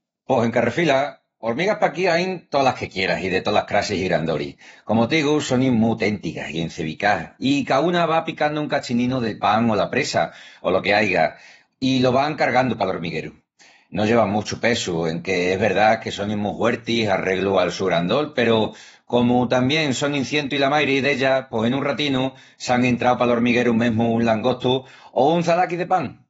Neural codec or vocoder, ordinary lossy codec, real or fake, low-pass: vocoder, 48 kHz, 128 mel bands, Vocos; AAC, 24 kbps; fake; 19.8 kHz